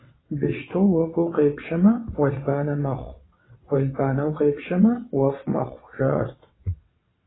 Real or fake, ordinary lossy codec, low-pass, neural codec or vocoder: fake; AAC, 16 kbps; 7.2 kHz; vocoder, 22.05 kHz, 80 mel bands, WaveNeXt